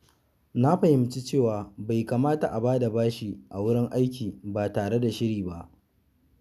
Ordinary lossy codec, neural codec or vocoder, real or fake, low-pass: none; none; real; 14.4 kHz